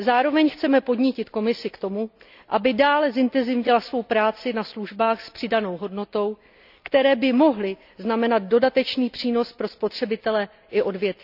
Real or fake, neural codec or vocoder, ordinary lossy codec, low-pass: real; none; none; 5.4 kHz